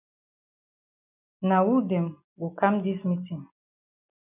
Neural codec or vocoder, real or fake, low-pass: none; real; 3.6 kHz